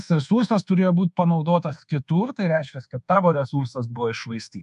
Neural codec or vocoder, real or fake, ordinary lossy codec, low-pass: codec, 24 kHz, 1.2 kbps, DualCodec; fake; AAC, 96 kbps; 10.8 kHz